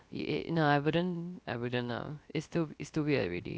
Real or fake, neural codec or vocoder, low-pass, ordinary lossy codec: fake; codec, 16 kHz, 0.3 kbps, FocalCodec; none; none